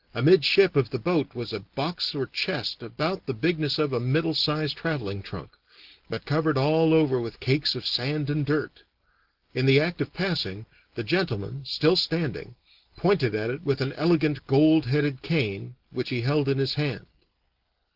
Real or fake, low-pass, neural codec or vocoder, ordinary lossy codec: real; 5.4 kHz; none; Opus, 16 kbps